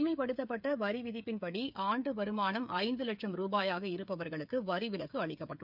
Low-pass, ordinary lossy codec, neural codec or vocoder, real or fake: 5.4 kHz; none; codec, 16 kHz, 8 kbps, FreqCodec, smaller model; fake